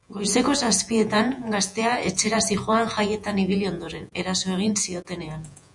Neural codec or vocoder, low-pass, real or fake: vocoder, 48 kHz, 128 mel bands, Vocos; 10.8 kHz; fake